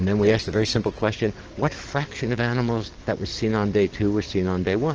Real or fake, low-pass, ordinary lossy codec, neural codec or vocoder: fake; 7.2 kHz; Opus, 16 kbps; codec, 44.1 kHz, 7.8 kbps, DAC